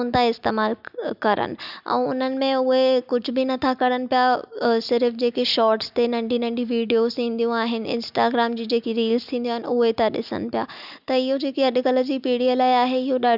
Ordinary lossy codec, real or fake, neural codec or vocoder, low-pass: none; real; none; 5.4 kHz